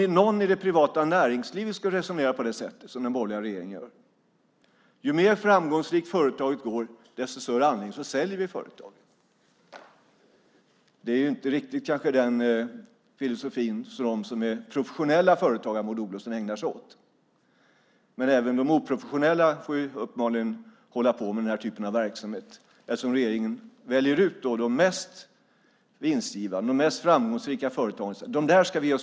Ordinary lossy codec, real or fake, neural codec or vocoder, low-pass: none; real; none; none